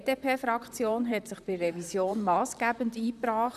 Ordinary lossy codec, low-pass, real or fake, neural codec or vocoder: none; 14.4 kHz; fake; vocoder, 44.1 kHz, 128 mel bands, Pupu-Vocoder